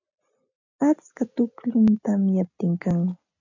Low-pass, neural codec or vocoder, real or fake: 7.2 kHz; none; real